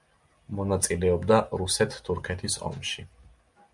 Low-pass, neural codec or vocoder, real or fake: 10.8 kHz; none; real